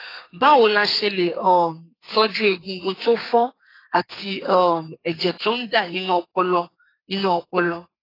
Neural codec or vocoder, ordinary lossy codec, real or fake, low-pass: codec, 32 kHz, 1.9 kbps, SNAC; AAC, 24 kbps; fake; 5.4 kHz